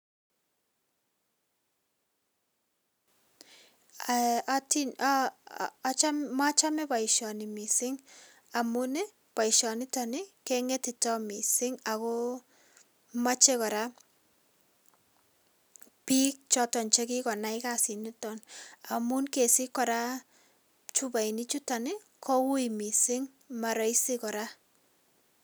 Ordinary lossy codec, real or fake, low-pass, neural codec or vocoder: none; real; none; none